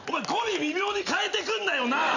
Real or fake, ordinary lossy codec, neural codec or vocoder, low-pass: real; none; none; 7.2 kHz